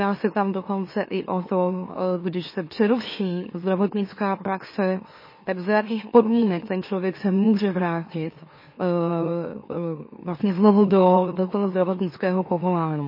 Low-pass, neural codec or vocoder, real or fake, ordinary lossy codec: 5.4 kHz; autoencoder, 44.1 kHz, a latent of 192 numbers a frame, MeloTTS; fake; MP3, 24 kbps